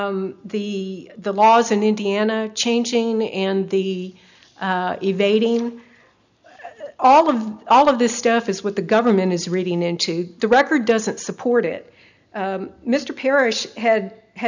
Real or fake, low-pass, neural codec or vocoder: real; 7.2 kHz; none